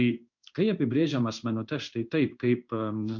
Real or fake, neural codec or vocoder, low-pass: fake; codec, 16 kHz in and 24 kHz out, 1 kbps, XY-Tokenizer; 7.2 kHz